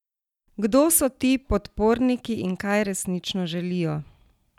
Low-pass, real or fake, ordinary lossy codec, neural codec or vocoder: 19.8 kHz; real; none; none